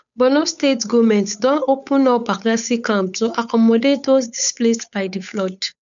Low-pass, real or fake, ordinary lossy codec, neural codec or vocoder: 7.2 kHz; fake; none; codec, 16 kHz, 8 kbps, FunCodec, trained on Chinese and English, 25 frames a second